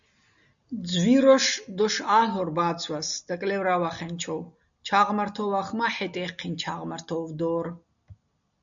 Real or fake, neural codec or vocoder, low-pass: real; none; 7.2 kHz